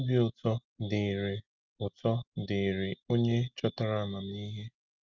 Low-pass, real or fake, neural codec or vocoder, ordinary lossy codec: 7.2 kHz; real; none; Opus, 32 kbps